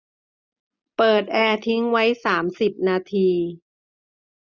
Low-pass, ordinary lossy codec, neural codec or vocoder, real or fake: 7.2 kHz; none; none; real